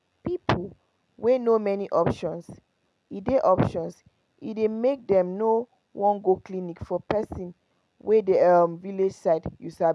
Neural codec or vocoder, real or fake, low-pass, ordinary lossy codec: none; real; none; none